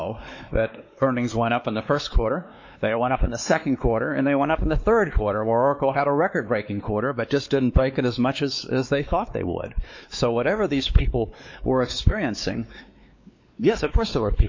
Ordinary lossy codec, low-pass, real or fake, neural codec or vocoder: MP3, 48 kbps; 7.2 kHz; fake; codec, 16 kHz, 2 kbps, X-Codec, WavLM features, trained on Multilingual LibriSpeech